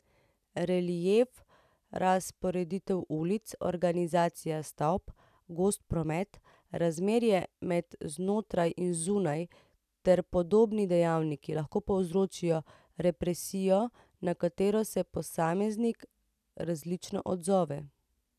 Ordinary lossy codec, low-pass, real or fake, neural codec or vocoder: none; 14.4 kHz; real; none